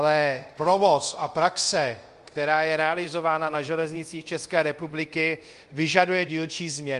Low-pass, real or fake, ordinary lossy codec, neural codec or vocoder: 10.8 kHz; fake; Opus, 32 kbps; codec, 24 kHz, 0.5 kbps, DualCodec